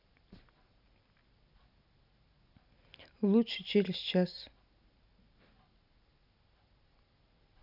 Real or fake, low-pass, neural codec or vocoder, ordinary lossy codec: real; 5.4 kHz; none; none